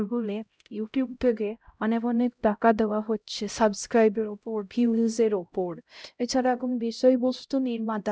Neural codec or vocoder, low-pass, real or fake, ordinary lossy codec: codec, 16 kHz, 0.5 kbps, X-Codec, HuBERT features, trained on LibriSpeech; none; fake; none